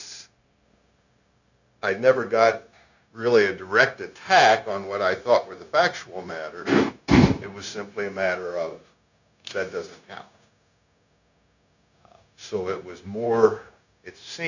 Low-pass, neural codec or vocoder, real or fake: 7.2 kHz; codec, 16 kHz, 0.9 kbps, LongCat-Audio-Codec; fake